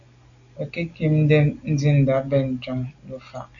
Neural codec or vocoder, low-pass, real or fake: none; 7.2 kHz; real